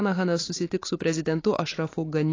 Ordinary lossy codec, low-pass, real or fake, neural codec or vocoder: AAC, 32 kbps; 7.2 kHz; real; none